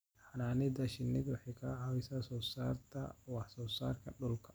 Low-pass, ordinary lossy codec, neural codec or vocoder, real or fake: none; none; none; real